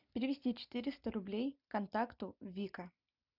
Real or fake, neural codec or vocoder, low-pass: real; none; 5.4 kHz